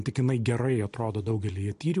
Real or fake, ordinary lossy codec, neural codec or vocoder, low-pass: real; MP3, 48 kbps; none; 10.8 kHz